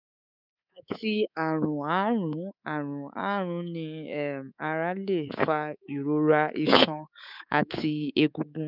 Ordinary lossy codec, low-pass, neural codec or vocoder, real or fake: none; 5.4 kHz; codec, 24 kHz, 3.1 kbps, DualCodec; fake